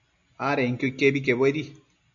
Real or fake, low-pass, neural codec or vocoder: real; 7.2 kHz; none